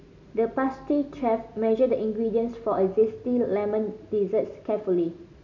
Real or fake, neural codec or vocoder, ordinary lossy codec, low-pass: real; none; none; 7.2 kHz